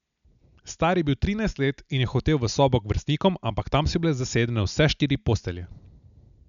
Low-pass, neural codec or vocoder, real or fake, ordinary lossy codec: 7.2 kHz; none; real; none